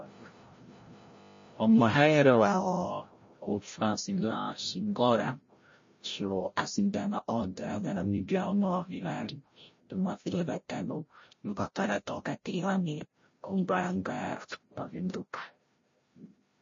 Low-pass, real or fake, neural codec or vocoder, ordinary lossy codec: 7.2 kHz; fake; codec, 16 kHz, 0.5 kbps, FreqCodec, larger model; MP3, 32 kbps